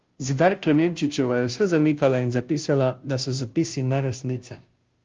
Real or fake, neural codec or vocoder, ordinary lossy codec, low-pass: fake; codec, 16 kHz, 0.5 kbps, FunCodec, trained on Chinese and English, 25 frames a second; Opus, 32 kbps; 7.2 kHz